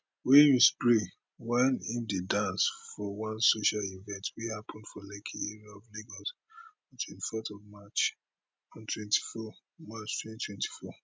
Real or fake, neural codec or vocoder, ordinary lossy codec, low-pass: real; none; none; none